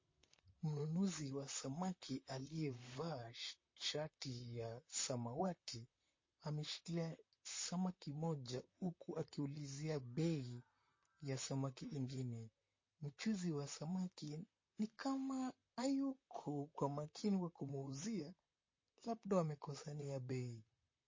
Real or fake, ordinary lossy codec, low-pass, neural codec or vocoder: fake; MP3, 32 kbps; 7.2 kHz; vocoder, 44.1 kHz, 128 mel bands, Pupu-Vocoder